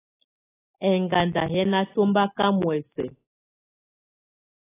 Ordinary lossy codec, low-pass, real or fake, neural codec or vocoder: AAC, 16 kbps; 3.6 kHz; real; none